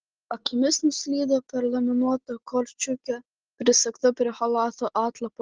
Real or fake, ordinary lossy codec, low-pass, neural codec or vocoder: real; Opus, 16 kbps; 7.2 kHz; none